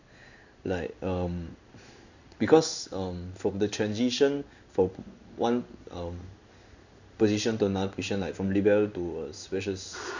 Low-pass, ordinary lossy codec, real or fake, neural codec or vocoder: 7.2 kHz; none; fake; codec, 16 kHz in and 24 kHz out, 1 kbps, XY-Tokenizer